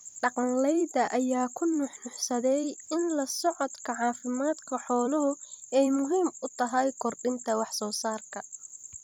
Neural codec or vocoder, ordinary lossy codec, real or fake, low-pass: vocoder, 44.1 kHz, 128 mel bands every 256 samples, BigVGAN v2; none; fake; 19.8 kHz